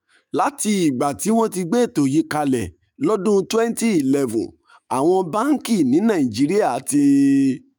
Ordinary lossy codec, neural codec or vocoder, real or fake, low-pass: none; autoencoder, 48 kHz, 128 numbers a frame, DAC-VAE, trained on Japanese speech; fake; none